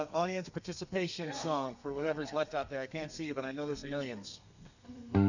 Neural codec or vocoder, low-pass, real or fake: codec, 32 kHz, 1.9 kbps, SNAC; 7.2 kHz; fake